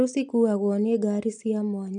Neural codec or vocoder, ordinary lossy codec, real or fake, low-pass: none; none; real; 9.9 kHz